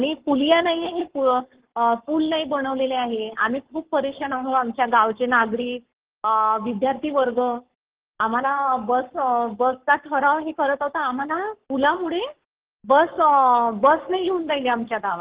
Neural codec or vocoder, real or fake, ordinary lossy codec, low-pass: codec, 44.1 kHz, 7.8 kbps, Pupu-Codec; fake; Opus, 16 kbps; 3.6 kHz